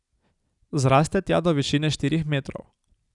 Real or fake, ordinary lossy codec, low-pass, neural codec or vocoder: real; none; 10.8 kHz; none